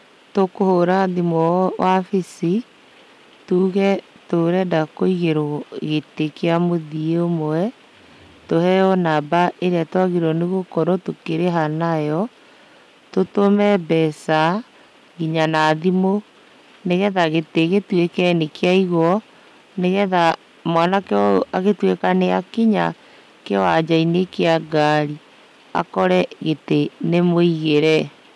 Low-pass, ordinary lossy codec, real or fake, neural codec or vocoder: none; none; real; none